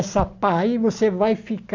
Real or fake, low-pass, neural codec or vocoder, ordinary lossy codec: real; 7.2 kHz; none; AAC, 48 kbps